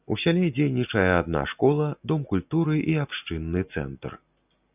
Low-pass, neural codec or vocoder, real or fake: 3.6 kHz; none; real